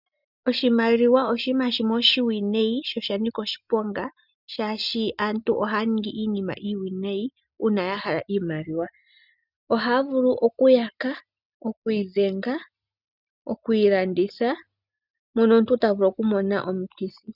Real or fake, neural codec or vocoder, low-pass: real; none; 5.4 kHz